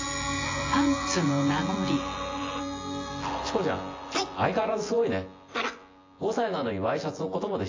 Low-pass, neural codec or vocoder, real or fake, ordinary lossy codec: 7.2 kHz; vocoder, 24 kHz, 100 mel bands, Vocos; fake; AAC, 32 kbps